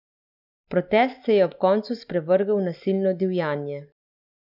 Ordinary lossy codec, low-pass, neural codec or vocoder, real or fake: none; 5.4 kHz; none; real